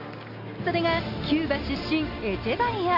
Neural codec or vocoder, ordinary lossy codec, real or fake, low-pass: none; none; real; 5.4 kHz